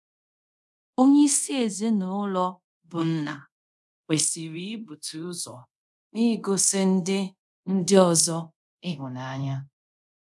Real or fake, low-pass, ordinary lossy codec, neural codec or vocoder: fake; none; none; codec, 24 kHz, 0.5 kbps, DualCodec